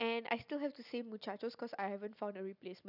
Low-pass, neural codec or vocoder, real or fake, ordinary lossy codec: 5.4 kHz; none; real; none